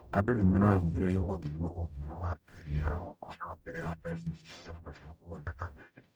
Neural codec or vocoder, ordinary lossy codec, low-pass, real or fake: codec, 44.1 kHz, 0.9 kbps, DAC; none; none; fake